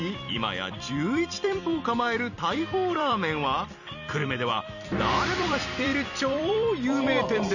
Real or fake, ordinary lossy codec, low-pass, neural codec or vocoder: fake; none; 7.2 kHz; vocoder, 44.1 kHz, 128 mel bands every 256 samples, BigVGAN v2